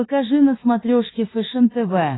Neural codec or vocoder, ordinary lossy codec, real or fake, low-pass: autoencoder, 48 kHz, 32 numbers a frame, DAC-VAE, trained on Japanese speech; AAC, 16 kbps; fake; 7.2 kHz